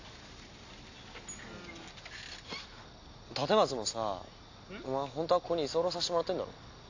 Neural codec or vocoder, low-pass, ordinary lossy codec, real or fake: none; 7.2 kHz; AAC, 48 kbps; real